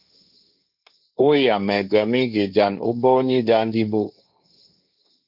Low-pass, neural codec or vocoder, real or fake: 5.4 kHz; codec, 16 kHz, 1.1 kbps, Voila-Tokenizer; fake